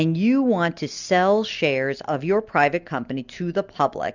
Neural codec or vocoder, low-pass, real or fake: none; 7.2 kHz; real